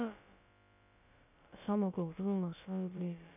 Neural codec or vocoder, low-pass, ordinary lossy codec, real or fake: codec, 16 kHz, about 1 kbps, DyCAST, with the encoder's durations; 3.6 kHz; none; fake